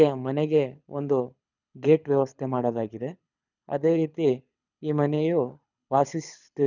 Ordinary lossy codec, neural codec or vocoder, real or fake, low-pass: none; codec, 24 kHz, 6 kbps, HILCodec; fake; 7.2 kHz